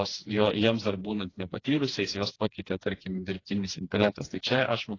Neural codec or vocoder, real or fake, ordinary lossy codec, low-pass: codec, 16 kHz, 2 kbps, FreqCodec, smaller model; fake; AAC, 32 kbps; 7.2 kHz